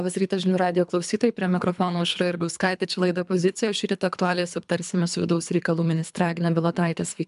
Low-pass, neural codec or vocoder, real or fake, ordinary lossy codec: 10.8 kHz; codec, 24 kHz, 3 kbps, HILCodec; fake; MP3, 96 kbps